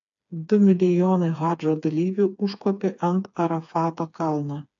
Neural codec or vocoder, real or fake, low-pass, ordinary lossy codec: codec, 16 kHz, 4 kbps, FreqCodec, smaller model; fake; 7.2 kHz; AAC, 64 kbps